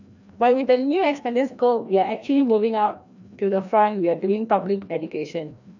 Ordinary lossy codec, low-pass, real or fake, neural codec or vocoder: none; 7.2 kHz; fake; codec, 16 kHz, 1 kbps, FreqCodec, larger model